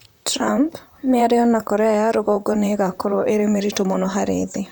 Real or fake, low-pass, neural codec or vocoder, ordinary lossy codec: fake; none; vocoder, 44.1 kHz, 128 mel bands, Pupu-Vocoder; none